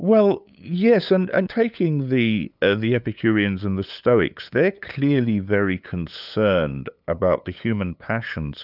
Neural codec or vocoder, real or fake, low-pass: codec, 16 kHz, 8 kbps, FunCodec, trained on LibriTTS, 25 frames a second; fake; 5.4 kHz